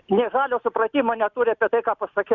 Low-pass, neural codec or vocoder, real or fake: 7.2 kHz; none; real